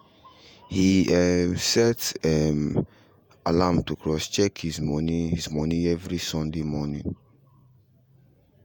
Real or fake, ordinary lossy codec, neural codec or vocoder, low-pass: fake; none; vocoder, 48 kHz, 128 mel bands, Vocos; none